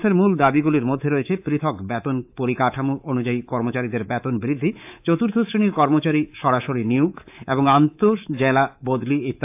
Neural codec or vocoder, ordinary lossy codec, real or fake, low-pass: codec, 24 kHz, 3.1 kbps, DualCodec; AAC, 32 kbps; fake; 3.6 kHz